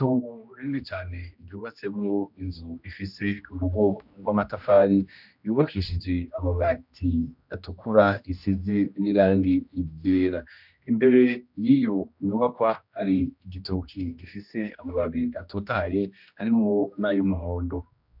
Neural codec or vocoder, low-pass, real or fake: codec, 16 kHz, 1 kbps, X-Codec, HuBERT features, trained on general audio; 5.4 kHz; fake